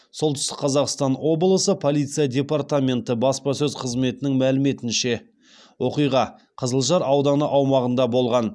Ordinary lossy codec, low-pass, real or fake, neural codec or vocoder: none; none; real; none